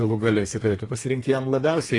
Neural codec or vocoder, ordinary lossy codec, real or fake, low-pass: codec, 32 kHz, 1.9 kbps, SNAC; AAC, 32 kbps; fake; 10.8 kHz